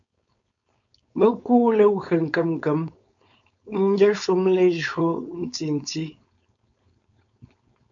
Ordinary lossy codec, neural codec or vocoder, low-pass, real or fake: AAC, 64 kbps; codec, 16 kHz, 4.8 kbps, FACodec; 7.2 kHz; fake